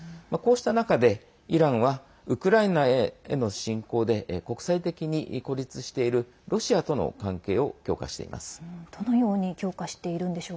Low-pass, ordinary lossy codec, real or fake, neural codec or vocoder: none; none; real; none